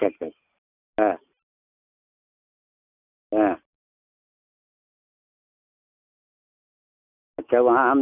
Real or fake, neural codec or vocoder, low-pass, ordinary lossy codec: real; none; 3.6 kHz; none